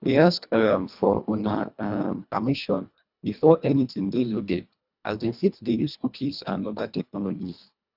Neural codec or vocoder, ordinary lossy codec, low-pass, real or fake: codec, 24 kHz, 1.5 kbps, HILCodec; none; 5.4 kHz; fake